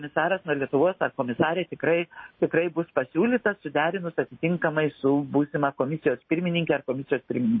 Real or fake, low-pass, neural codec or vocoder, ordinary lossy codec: real; 7.2 kHz; none; MP3, 24 kbps